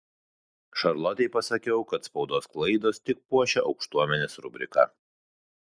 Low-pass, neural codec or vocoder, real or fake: 9.9 kHz; none; real